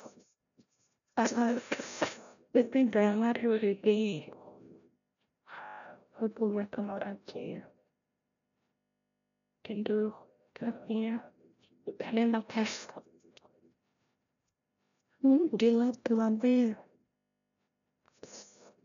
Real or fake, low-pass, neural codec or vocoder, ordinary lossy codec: fake; 7.2 kHz; codec, 16 kHz, 0.5 kbps, FreqCodec, larger model; none